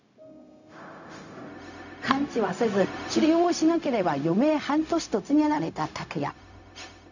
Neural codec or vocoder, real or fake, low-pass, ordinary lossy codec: codec, 16 kHz, 0.4 kbps, LongCat-Audio-Codec; fake; 7.2 kHz; none